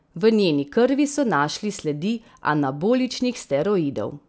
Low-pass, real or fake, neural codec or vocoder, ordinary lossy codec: none; real; none; none